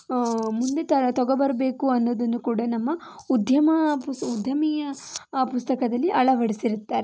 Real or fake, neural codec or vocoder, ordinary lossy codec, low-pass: real; none; none; none